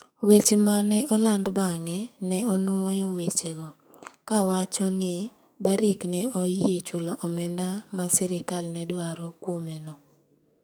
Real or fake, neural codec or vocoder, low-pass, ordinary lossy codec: fake; codec, 44.1 kHz, 2.6 kbps, SNAC; none; none